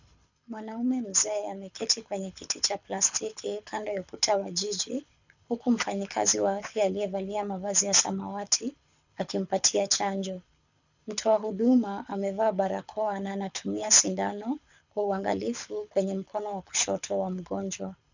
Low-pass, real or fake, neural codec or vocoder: 7.2 kHz; fake; codec, 24 kHz, 6 kbps, HILCodec